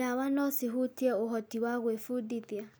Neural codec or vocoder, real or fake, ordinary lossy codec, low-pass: none; real; none; none